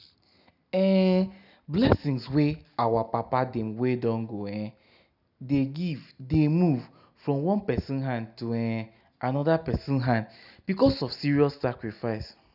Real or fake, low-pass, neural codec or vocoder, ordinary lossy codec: real; 5.4 kHz; none; none